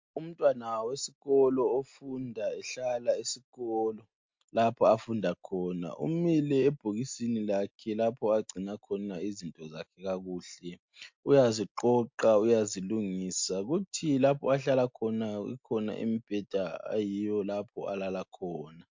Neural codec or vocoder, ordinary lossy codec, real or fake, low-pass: none; MP3, 48 kbps; real; 7.2 kHz